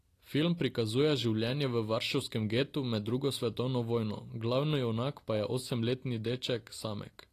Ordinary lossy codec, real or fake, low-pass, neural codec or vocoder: AAC, 48 kbps; real; 14.4 kHz; none